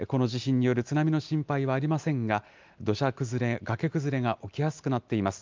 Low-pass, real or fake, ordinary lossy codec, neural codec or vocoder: 7.2 kHz; real; Opus, 24 kbps; none